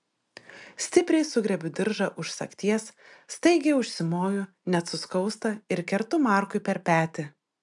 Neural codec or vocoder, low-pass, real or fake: none; 10.8 kHz; real